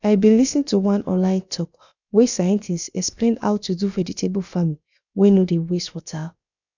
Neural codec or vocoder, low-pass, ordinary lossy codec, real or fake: codec, 16 kHz, about 1 kbps, DyCAST, with the encoder's durations; 7.2 kHz; none; fake